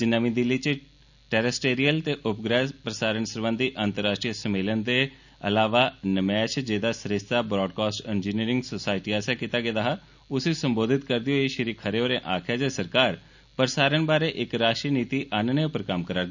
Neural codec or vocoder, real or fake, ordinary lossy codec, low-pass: none; real; none; 7.2 kHz